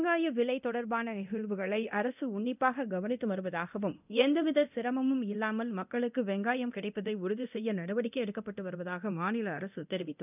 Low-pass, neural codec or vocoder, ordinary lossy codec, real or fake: 3.6 kHz; codec, 24 kHz, 0.9 kbps, DualCodec; none; fake